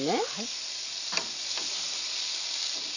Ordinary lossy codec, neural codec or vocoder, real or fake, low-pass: none; none; real; 7.2 kHz